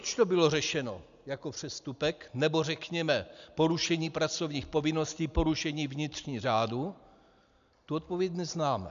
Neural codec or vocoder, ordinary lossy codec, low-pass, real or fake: none; MP3, 96 kbps; 7.2 kHz; real